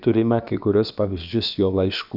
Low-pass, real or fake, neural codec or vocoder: 5.4 kHz; fake; codec, 16 kHz, about 1 kbps, DyCAST, with the encoder's durations